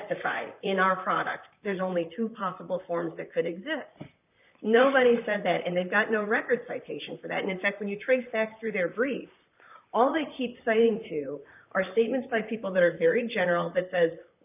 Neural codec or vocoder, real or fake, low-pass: vocoder, 44.1 kHz, 128 mel bands, Pupu-Vocoder; fake; 3.6 kHz